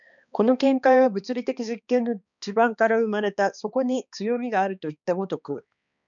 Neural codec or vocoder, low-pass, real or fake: codec, 16 kHz, 2 kbps, X-Codec, HuBERT features, trained on balanced general audio; 7.2 kHz; fake